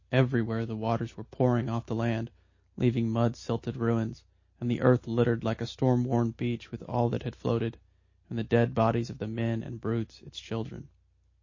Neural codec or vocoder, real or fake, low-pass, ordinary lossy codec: none; real; 7.2 kHz; MP3, 32 kbps